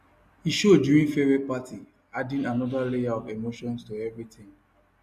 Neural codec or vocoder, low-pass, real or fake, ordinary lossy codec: none; 14.4 kHz; real; none